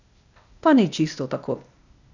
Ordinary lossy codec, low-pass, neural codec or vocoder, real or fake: none; 7.2 kHz; codec, 16 kHz, 0.8 kbps, ZipCodec; fake